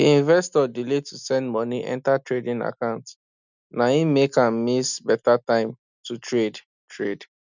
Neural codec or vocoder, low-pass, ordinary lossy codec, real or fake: none; 7.2 kHz; none; real